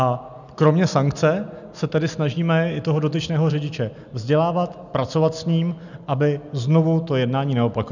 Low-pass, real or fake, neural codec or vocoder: 7.2 kHz; real; none